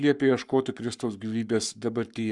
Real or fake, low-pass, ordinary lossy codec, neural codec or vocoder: fake; 10.8 kHz; Opus, 64 kbps; codec, 44.1 kHz, 7.8 kbps, Pupu-Codec